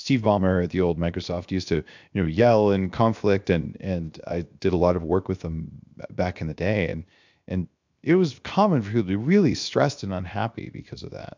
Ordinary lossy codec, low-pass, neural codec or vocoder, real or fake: MP3, 64 kbps; 7.2 kHz; codec, 16 kHz, 0.7 kbps, FocalCodec; fake